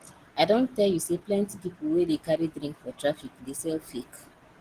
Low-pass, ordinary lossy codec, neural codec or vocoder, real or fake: 14.4 kHz; Opus, 16 kbps; none; real